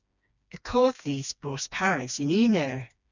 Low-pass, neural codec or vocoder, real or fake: 7.2 kHz; codec, 16 kHz, 2 kbps, FreqCodec, smaller model; fake